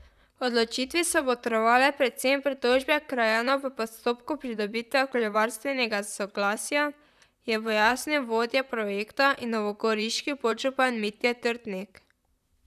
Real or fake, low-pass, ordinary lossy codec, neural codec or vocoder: fake; 14.4 kHz; none; vocoder, 44.1 kHz, 128 mel bands, Pupu-Vocoder